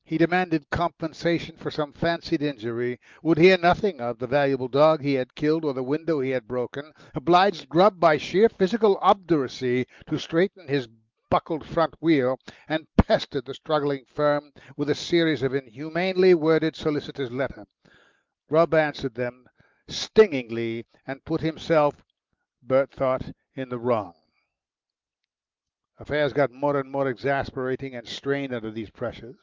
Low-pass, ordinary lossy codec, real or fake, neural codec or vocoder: 7.2 kHz; Opus, 32 kbps; real; none